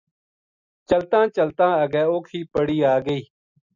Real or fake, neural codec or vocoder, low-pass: real; none; 7.2 kHz